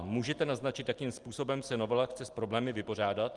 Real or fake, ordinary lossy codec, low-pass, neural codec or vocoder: real; Opus, 24 kbps; 10.8 kHz; none